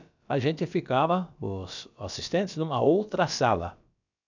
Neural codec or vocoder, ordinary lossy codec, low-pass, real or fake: codec, 16 kHz, about 1 kbps, DyCAST, with the encoder's durations; none; 7.2 kHz; fake